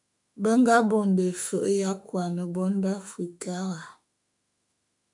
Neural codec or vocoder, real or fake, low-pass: autoencoder, 48 kHz, 32 numbers a frame, DAC-VAE, trained on Japanese speech; fake; 10.8 kHz